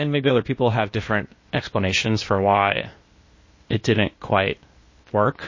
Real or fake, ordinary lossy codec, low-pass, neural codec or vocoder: fake; MP3, 32 kbps; 7.2 kHz; codec, 16 kHz, 0.8 kbps, ZipCodec